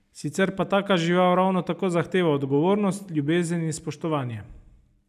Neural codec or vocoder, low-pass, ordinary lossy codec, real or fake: none; 14.4 kHz; none; real